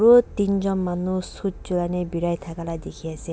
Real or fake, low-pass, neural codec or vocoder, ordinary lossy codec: real; none; none; none